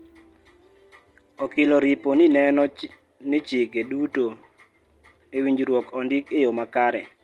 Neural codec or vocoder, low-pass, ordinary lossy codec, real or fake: none; 19.8 kHz; Opus, 24 kbps; real